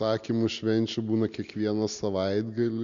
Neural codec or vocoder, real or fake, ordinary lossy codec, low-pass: none; real; MP3, 64 kbps; 7.2 kHz